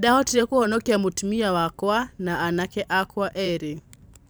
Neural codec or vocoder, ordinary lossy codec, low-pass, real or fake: vocoder, 44.1 kHz, 128 mel bands every 512 samples, BigVGAN v2; none; none; fake